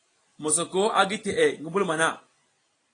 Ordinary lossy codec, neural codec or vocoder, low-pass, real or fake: AAC, 32 kbps; none; 9.9 kHz; real